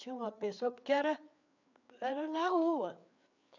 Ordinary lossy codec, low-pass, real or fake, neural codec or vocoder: none; 7.2 kHz; fake; codec, 16 kHz, 4 kbps, FreqCodec, larger model